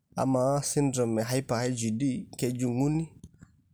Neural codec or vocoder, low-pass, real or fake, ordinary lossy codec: none; none; real; none